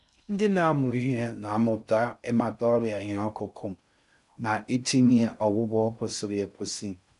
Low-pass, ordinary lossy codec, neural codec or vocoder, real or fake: 10.8 kHz; none; codec, 16 kHz in and 24 kHz out, 0.6 kbps, FocalCodec, streaming, 2048 codes; fake